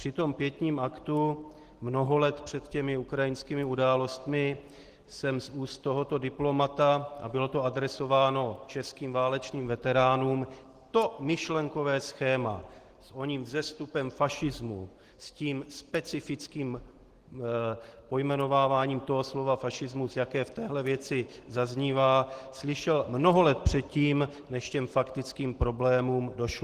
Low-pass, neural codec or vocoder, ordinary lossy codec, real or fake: 14.4 kHz; none; Opus, 16 kbps; real